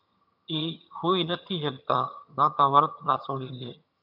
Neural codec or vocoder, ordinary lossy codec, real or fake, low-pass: vocoder, 22.05 kHz, 80 mel bands, HiFi-GAN; AAC, 48 kbps; fake; 5.4 kHz